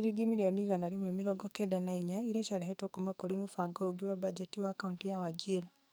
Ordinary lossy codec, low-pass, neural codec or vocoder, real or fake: none; none; codec, 44.1 kHz, 2.6 kbps, SNAC; fake